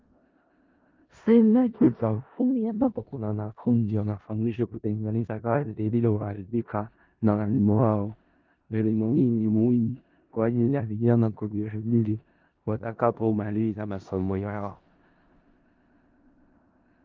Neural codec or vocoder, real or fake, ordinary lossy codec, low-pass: codec, 16 kHz in and 24 kHz out, 0.4 kbps, LongCat-Audio-Codec, four codebook decoder; fake; Opus, 32 kbps; 7.2 kHz